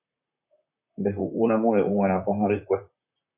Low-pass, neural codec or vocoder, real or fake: 3.6 kHz; vocoder, 44.1 kHz, 128 mel bands, Pupu-Vocoder; fake